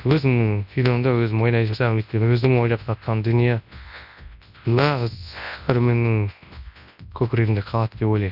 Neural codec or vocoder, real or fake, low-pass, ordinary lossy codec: codec, 24 kHz, 0.9 kbps, WavTokenizer, large speech release; fake; 5.4 kHz; none